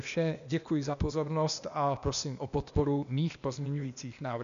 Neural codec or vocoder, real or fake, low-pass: codec, 16 kHz, 0.8 kbps, ZipCodec; fake; 7.2 kHz